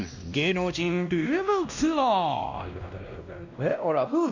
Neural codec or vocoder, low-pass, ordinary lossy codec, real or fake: codec, 16 kHz, 1 kbps, X-Codec, WavLM features, trained on Multilingual LibriSpeech; 7.2 kHz; none; fake